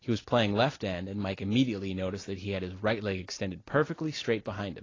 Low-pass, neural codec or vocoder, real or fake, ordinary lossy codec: 7.2 kHz; none; real; AAC, 32 kbps